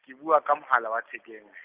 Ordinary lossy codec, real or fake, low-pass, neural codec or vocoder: Opus, 24 kbps; real; 3.6 kHz; none